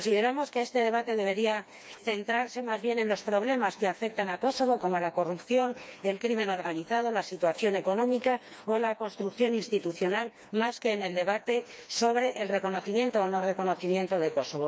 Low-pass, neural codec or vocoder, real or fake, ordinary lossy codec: none; codec, 16 kHz, 2 kbps, FreqCodec, smaller model; fake; none